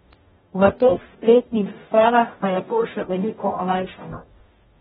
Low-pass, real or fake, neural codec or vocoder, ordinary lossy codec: 19.8 kHz; fake; codec, 44.1 kHz, 0.9 kbps, DAC; AAC, 16 kbps